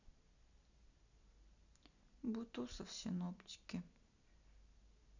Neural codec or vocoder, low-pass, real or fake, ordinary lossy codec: none; 7.2 kHz; real; none